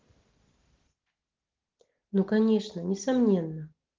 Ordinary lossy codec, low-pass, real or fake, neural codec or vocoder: Opus, 16 kbps; 7.2 kHz; real; none